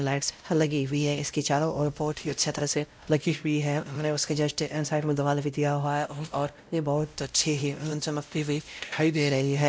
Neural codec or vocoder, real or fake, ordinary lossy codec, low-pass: codec, 16 kHz, 0.5 kbps, X-Codec, WavLM features, trained on Multilingual LibriSpeech; fake; none; none